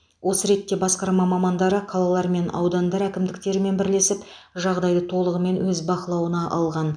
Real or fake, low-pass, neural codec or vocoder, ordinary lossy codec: real; 9.9 kHz; none; MP3, 96 kbps